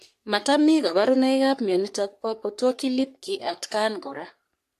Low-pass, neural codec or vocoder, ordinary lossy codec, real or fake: 14.4 kHz; codec, 44.1 kHz, 3.4 kbps, Pupu-Codec; AAC, 64 kbps; fake